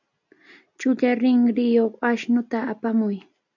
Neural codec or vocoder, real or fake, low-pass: none; real; 7.2 kHz